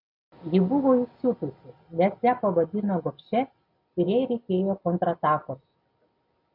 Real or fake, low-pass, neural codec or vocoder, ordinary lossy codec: real; 5.4 kHz; none; AAC, 48 kbps